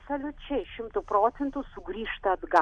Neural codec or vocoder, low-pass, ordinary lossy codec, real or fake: none; 9.9 kHz; AAC, 64 kbps; real